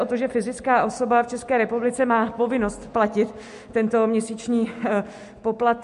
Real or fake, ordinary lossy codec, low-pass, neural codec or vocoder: real; MP3, 64 kbps; 10.8 kHz; none